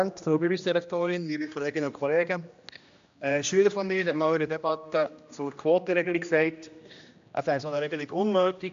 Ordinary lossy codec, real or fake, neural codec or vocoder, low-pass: AAC, 64 kbps; fake; codec, 16 kHz, 1 kbps, X-Codec, HuBERT features, trained on general audio; 7.2 kHz